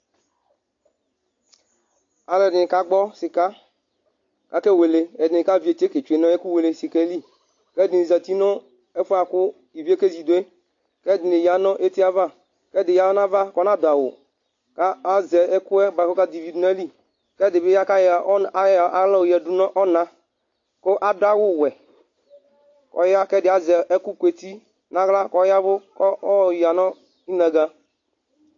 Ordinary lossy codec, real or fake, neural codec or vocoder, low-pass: AAC, 48 kbps; real; none; 7.2 kHz